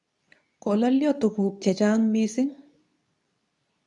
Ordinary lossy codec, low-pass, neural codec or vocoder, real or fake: none; 10.8 kHz; codec, 24 kHz, 0.9 kbps, WavTokenizer, medium speech release version 2; fake